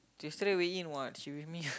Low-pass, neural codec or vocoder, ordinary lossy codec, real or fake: none; none; none; real